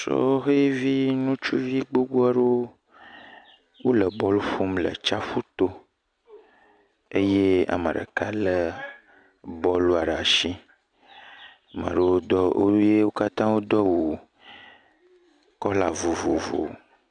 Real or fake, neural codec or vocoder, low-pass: real; none; 9.9 kHz